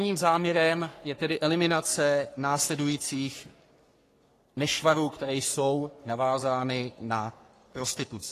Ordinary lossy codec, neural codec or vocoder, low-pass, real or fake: AAC, 48 kbps; codec, 44.1 kHz, 3.4 kbps, Pupu-Codec; 14.4 kHz; fake